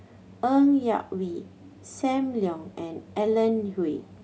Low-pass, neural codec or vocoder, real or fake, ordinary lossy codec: none; none; real; none